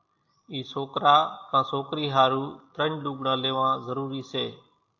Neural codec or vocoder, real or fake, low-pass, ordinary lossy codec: none; real; 7.2 kHz; AAC, 48 kbps